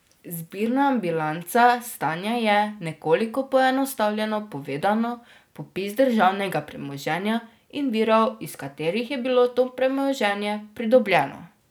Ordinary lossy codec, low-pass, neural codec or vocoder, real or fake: none; none; none; real